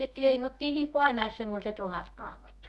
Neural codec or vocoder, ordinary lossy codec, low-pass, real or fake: codec, 24 kHz, 0.9 kbps, WavTokenizer, medium music audio release; none; none; fake